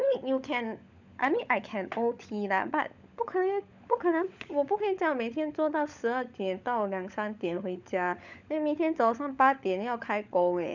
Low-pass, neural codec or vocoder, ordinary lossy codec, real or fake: 7.2 kHz; codec, 16 kHz, 16 kbps, FunCodec, trained on LibriTTS, 50 frames a second; none; fake